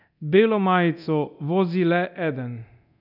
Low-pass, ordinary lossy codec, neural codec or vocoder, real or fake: 5.4 kHz; none; codec, 24 kHz, 0.9 kbps, DualCodec; fake